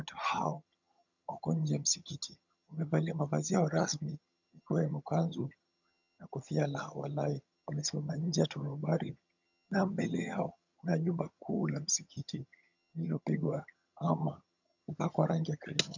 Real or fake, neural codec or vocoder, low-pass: fake; vocoder, 22.05 kHz, 80 mel bands, HiFi-GAN; 7.2 kHz